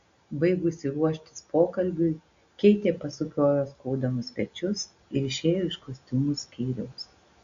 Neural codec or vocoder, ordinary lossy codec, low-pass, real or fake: none; AAC, 96 kbps; 7.2 kHz; real